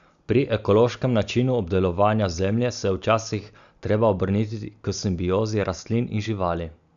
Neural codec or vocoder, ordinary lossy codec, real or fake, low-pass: none; none; real; 7.2 kHz